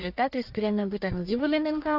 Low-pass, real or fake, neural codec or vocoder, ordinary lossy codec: 5.4 kHz; fake; codec, 44.1 kHz, 1.7 kbps, Pupu-Codec; AAC, 48 kbps